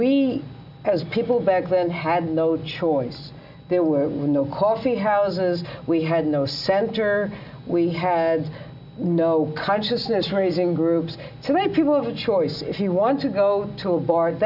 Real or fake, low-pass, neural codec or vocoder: real; 5.4 kHz; none